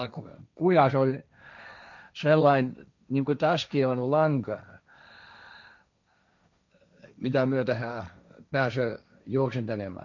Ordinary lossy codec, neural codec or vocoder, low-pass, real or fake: none; codec, 16 kHz, 1.1 kbps, Voila-Tokenizer; 7.2 kHz; fake